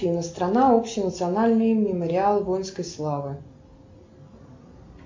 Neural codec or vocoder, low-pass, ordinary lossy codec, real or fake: none; 7.2 kHz; MP3, 48 kbps; real